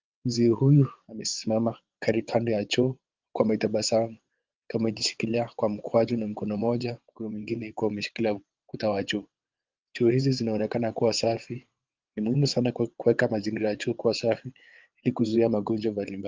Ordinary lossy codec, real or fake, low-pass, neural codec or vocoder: Opus, 32 kbps; fake; 7.2 kHz; vocoder, 22.05 kHz, 80 mel bands, WaveNeXt